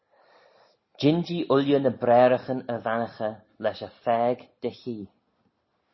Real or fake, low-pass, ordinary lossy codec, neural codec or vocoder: real; 7.2 kHz; MP3, 24 kbps; none